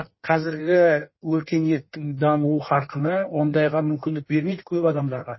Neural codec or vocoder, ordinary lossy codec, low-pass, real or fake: codec, 16 kHz in and 24 kHz out, 1.1 kbps, FireRedTTS-2 codec; MP3, 24 kbps; 7.2 kHz; fake